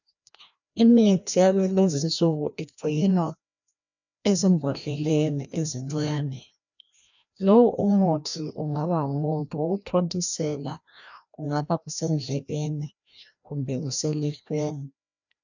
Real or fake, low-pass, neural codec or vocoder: fake; 7.2 kHz; codec, 16 kHz, 1 kbps, FreqCodec, larger model